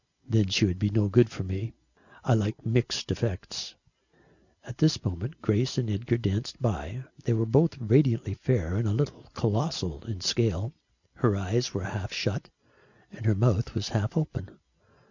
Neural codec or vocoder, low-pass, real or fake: none; 7.2 kHz; real